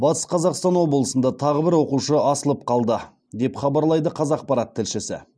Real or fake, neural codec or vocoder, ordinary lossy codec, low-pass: real; none; none; none